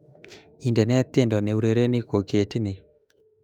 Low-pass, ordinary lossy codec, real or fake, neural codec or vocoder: 19.8 kHz; none; fake; autoencoder, 48 kHz, 32 numbers a frame, DAC-VAE, trained on Japanese speech